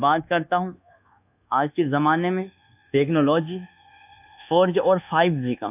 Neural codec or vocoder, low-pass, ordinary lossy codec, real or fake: codec, 24 kHz, 1.2 kbps, DualCodec; 3.6 kHz; AAC, 32 kbps; fake